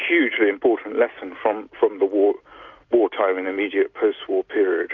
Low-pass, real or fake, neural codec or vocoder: 7.2 kHz; real; none